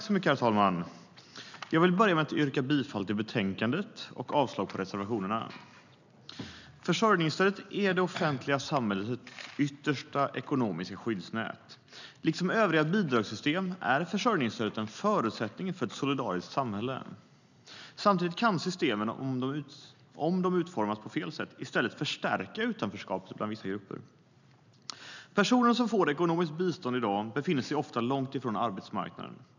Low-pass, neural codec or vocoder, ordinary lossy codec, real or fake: 7.2 kHz; none; none; real